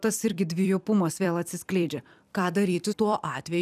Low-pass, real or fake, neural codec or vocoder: 14.4 kHz; fake; vocoder, 44.1 kHz, 128 mel bands every 256 samples, BigVGAN v2